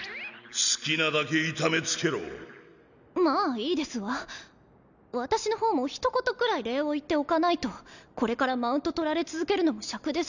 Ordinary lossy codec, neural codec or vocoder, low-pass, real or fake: none; none; 7.2 kHz; real